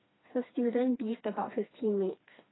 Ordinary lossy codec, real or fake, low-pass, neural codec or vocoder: AAC, 16 kbps; fake; 7.2 kHz; codec, 16 kHz, 2 kbps, FreqCodec, smaller model